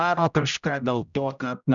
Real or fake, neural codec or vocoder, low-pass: fake; codec, 16 kHz, 0.5 kbps, X-Codec, HuBERT features, trained on general audio; 7.2 kHz